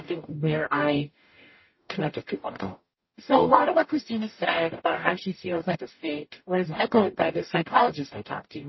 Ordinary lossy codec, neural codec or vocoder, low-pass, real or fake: MP3, 24 kbps; codec, 44.1 kHz, 0.9 kbps, DAC; 7.2 kHz; fake